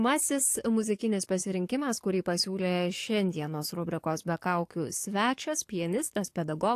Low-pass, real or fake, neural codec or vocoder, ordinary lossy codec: 14.4 kHz; fake; codec, 44.1 kHz, 7.8 kbps, DAC; AAC, 64 kbps